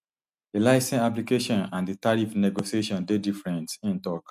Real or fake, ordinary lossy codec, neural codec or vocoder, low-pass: real; none; none; 14.4 kHz